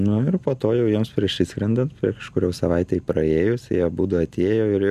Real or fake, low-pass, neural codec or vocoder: real; 14.4 kHz; none